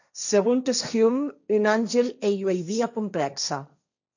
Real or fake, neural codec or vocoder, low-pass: fake; codec, 16 kHz, 1.1 kbps, Voila-Tokenizer; 7.2 kHz